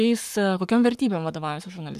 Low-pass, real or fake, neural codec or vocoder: 14.4 kHz; fake; codec, 44.1 kHz, 7.8 kbps, Pupu-Codec